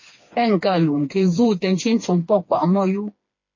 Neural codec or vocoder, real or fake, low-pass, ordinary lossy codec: codec, 16 kHz, 2 kbps, FreqCodec, smaller model; fake; 7.2 kHz; MP3, 32 kbps